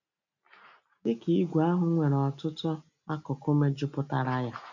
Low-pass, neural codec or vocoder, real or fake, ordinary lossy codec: 7.2 kHz; none; real; none